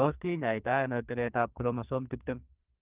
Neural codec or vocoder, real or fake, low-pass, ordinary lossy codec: codec, 44.1 kHz, 2.6 kbps, SNAC; fake; 3.6 kHz; Opus, 32 kbps